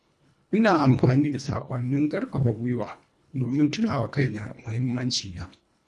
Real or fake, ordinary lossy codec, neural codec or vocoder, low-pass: fake; none; codec, 24 kHz, 1.5 kbps, HILCodec; none